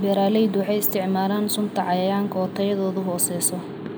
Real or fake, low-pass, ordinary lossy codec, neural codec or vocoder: real; none; none; none